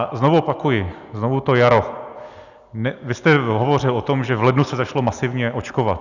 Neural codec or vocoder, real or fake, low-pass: none; real; 7.2 kHz